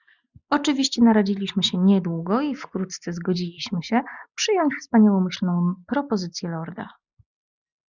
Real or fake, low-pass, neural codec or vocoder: real; 7.2 kHz; none